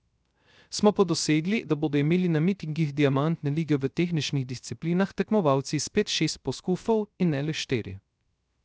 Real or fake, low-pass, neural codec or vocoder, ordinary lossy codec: fake; none; codec, 16 kHz, 0.3 kbps, FocalCodec; none